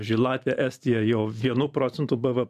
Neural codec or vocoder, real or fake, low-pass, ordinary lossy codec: vocoder, 44.1 kHz, 128 mel bands every 512 samples, BigVGAN v2; fake; 14.4 kHz; MP3, 96 kbps